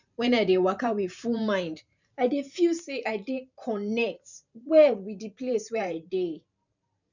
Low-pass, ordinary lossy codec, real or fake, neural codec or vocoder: 7.2 kHz; none; fake; vocoder, 44.1 kHz, 128 mel bands every 512 samples, BigVGAN v2